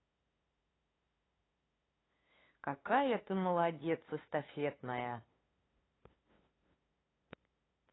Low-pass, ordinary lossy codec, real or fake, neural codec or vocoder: 7.2 kHz; AAC, 16 kbps; fake; codec, 16 kHz, 2 kbps, FunCodec, trained on LibriTTS, 25 frames a second